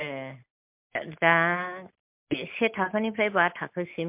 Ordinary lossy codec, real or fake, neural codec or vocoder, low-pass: MP3, 32 kbps; real; none; 3.6 kHz